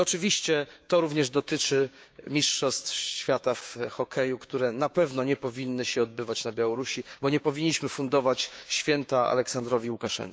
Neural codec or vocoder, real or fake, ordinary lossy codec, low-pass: codec, 16 kHz, 6 kbps, DAC; fake; none; none